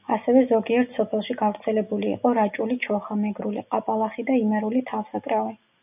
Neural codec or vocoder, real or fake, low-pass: none; real; 3.6 kHz